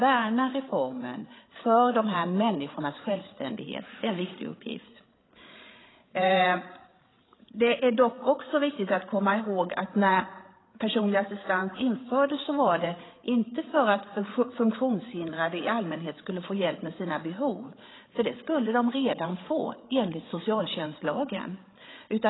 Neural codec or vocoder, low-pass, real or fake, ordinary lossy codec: codec, 16 kHz, 8 kbps, FreqCodec, larger model; 7.2 kHz; fake; AAC, 16 kbps